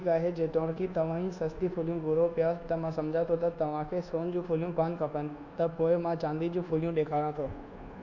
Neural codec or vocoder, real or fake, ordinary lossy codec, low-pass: codec, 24 kHz, 1.2 kbps, DualCodec; fake; none; 7.2 kHz